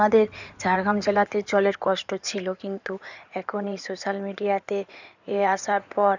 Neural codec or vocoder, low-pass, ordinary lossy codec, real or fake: codec, 16 kHz in and 24 kHz out, 2.2 kbps, FireRedTTS-2 codec; 7.2 kHz; none; fake